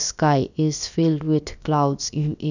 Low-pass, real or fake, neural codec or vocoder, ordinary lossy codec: 7.2 kHz; fake; codec, 16 kHz, about 1 kbps, DyCAST, with the encoder's durations; none